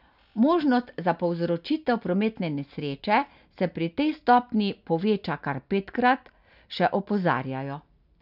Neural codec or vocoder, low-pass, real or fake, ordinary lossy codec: none; 5.4 kHz; real; none